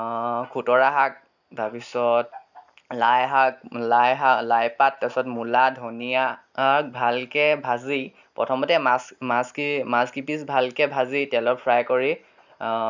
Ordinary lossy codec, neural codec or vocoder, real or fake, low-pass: none; none; real; 7.2 kHz